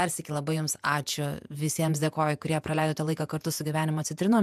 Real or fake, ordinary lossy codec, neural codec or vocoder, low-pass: fake; MP3, 96 kbps; vocoder, 48 kHz, 128 mel bands, Vocos; 14.4 kHz